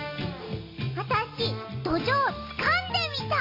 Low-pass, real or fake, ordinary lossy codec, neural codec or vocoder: 5.4 kHz; real; MP3, 32 kbps; none